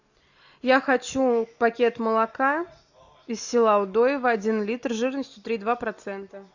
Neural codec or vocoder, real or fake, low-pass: none; real; 7.2 kHz